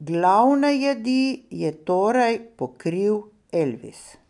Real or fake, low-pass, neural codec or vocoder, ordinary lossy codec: real; 10.8 kHz; none; none